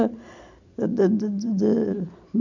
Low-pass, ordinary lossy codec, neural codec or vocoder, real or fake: 7.2 kHz; none; none; real